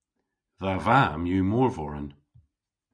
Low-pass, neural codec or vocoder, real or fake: 9.9 kHz; none; real